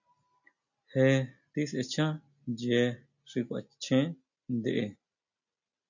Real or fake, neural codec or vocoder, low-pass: real; none; 7.2 kHz